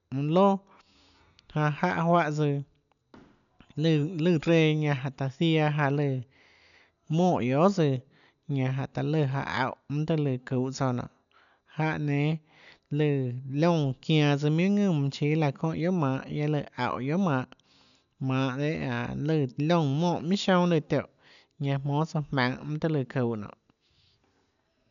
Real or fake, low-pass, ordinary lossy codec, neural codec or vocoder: real; 7.2 kHz; none; none